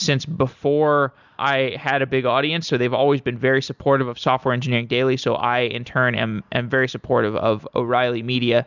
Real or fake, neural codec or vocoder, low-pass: real; none; 7.2 kHz